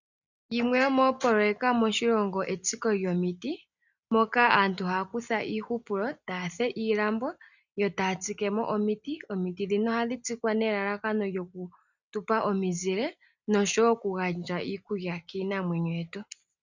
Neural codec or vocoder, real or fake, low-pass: none; real; 7.2 kHz